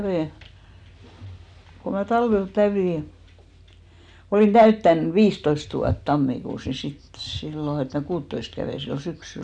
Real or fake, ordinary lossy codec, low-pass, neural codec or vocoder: real; none; 9.9 kHz; none